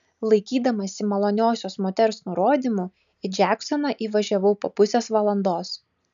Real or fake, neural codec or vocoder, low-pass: real; none; 7.2 kHz